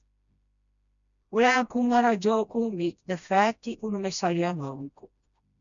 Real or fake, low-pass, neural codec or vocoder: fake; 7.2 kHz; codec, 16 kHz, 1 kbps, FreqCodec, smaller model